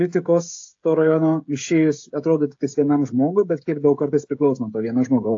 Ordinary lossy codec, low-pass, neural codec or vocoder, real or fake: AAC, 48 kbps; 7.2 kHz; codec, 16 kHz, 16 kbps, FreqCodec, smaller model; fake